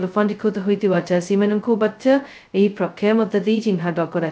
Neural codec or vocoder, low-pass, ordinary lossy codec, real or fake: codec, 16 kHz, 0.2 kbps, FocalCodec; none; none; fake